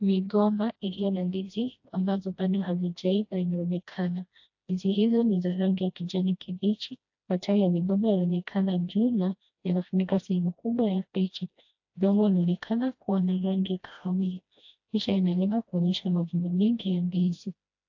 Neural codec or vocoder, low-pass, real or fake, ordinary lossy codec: codec, 16 kHz, 1 kbps, FreqCodec, smaller model; 7.2 kHz; fake; AAC, 48 kbps